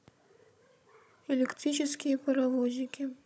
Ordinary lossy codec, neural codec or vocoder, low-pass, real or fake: none; codec, 16 kHz, 16 kbps, FunCodec, trained on Chinese and English, 50 frames a second; none; fake